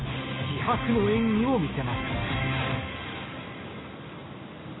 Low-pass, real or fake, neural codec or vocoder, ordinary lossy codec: 7.2 kHz; real; none; AAC, 16 kbps